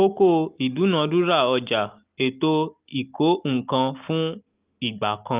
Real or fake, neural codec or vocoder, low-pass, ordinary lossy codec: real; none; 3.6 kHz; Opus, 32 kbps